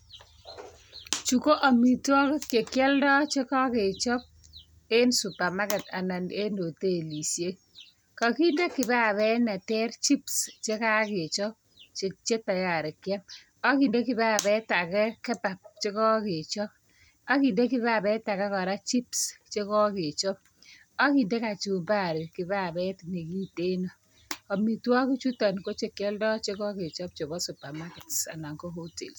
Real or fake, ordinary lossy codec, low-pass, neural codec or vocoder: real; none; none; none